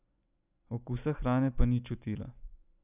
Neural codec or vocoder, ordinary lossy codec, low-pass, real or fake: none; none; 3.6 kHz; real